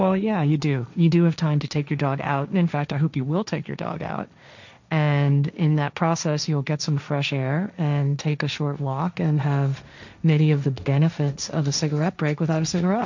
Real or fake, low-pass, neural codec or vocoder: fake; 7.2 kHz; codec, 16 kHz, 1.1 kbps, Voila-Tokenizer